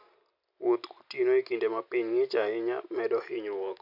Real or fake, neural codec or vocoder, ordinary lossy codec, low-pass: real; none; none; 5.4 kHz